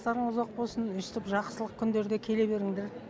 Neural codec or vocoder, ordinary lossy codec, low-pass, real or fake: none; none; none; real